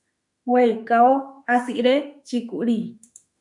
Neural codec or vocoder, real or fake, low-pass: autoencoder, 48 kHz, 32 numbers a frame, DAC-VAE, trained on Japanese speech; fake; 10.8 kHz